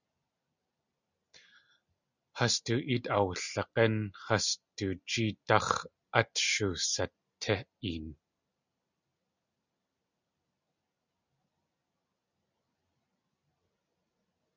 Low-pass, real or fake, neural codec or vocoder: 7.2 kHz; real; none